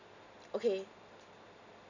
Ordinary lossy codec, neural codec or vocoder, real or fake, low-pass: none; none; real; 7.2 kHz